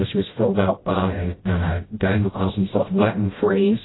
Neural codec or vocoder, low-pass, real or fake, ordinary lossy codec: codec, 16 kHz, 0.5 kbps, FreqCodec, smaller model; 7.2 kHz; fake; AAC, 16 kbps